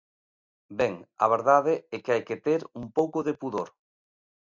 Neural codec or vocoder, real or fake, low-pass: none; real; 7.2 kHz